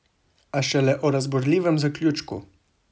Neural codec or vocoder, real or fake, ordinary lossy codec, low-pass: none; real; none; none